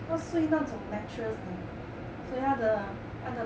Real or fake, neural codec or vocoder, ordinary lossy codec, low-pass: real; none; none; none